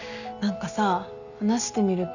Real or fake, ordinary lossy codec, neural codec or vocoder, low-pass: real; none; none; 7.2 kHz